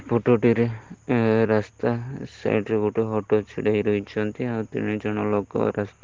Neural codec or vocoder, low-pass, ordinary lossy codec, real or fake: none; 7.2 kHz; Opus, 16 kbps; real